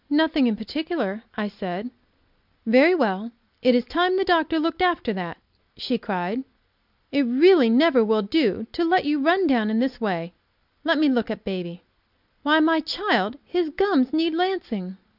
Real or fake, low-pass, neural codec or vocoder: real; 5.4 kHz; none